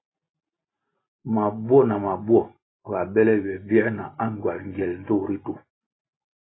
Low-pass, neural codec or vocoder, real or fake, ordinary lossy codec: 7.2 kHz; none; real; AAC, 16 kbps